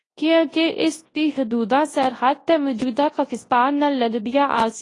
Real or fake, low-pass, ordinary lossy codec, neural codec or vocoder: fake; 10.8 kHz; AAC, 32 kbps; codec, 24 kHz, 0.9 kbps, WavTokenizer, large speech release